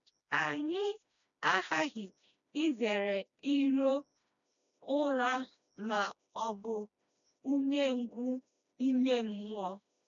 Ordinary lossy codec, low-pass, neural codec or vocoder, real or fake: none; 7.2 kHz; codec, 16 kHz, 1 kbps, FreqCodec, smaller model; fake